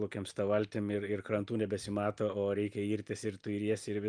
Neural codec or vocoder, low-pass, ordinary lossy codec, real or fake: none; 9.9 kHz; Opus, 32 kbps; real